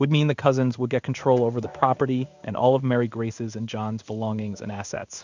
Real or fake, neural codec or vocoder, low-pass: fake; codec, 16 kHz in and 24 kHz out, 1 kbps, XY-Tokenizer; 7.2 kHz